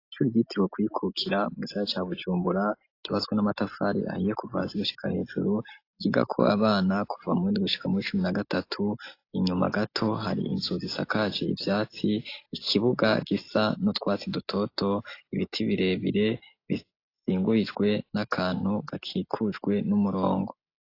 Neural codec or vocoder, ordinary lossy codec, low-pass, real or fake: none; AAC, 32 kbps; 5.4 kHz; real